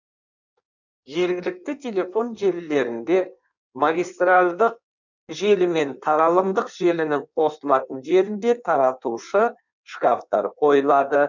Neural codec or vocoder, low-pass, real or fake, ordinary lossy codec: codec, 16 kHz in and 24 kHz out, 1.1 kbps, FireRedTTS-2 codec; 7.2 kHz; fake; none